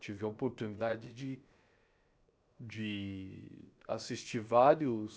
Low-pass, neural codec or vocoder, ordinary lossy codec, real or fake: none; codec, 16 kHz, 0.7 kbps, FocalCodec; none; fake